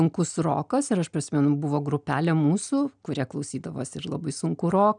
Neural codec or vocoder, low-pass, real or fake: none; 9.9 kHz; real